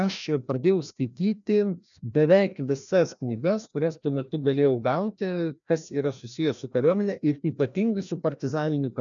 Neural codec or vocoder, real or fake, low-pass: codec, 16 kHz, 1 kbps, FreqCodec, larger model; fake; 7.2 kHz